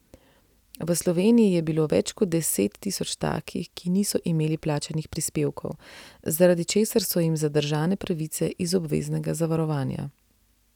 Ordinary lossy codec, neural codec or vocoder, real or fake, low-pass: none; none; real; 19.8 kHz